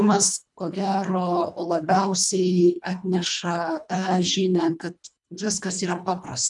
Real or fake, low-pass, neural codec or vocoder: fake; 10.8 kHz; codec, 24 kHz, 1.5 kbps, HILCodec